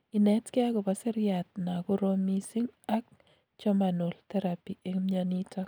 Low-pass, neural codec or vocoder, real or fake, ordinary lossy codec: none; none; real; none